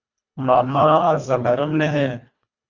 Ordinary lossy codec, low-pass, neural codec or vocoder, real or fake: Opus, 64 kbps; 7.2 kHz; codec, 24 kHz, 1.5 kbps, HILCodec; fake